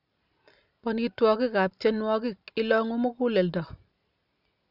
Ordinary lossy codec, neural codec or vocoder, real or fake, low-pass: none; none; real; 5.4 kHz